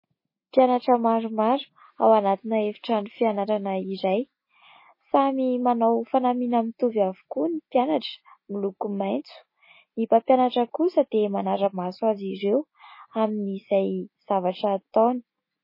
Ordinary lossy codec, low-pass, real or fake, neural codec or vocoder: MP3, 24 kbps; 5.4 kHz; real; none